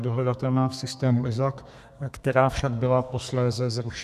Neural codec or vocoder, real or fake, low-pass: codec, 32 kHz, 1.9 kbps, SNAC; fake; 14.4 kHz